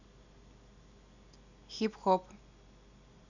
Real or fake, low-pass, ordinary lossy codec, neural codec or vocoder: real; 7.2 kHz; none; none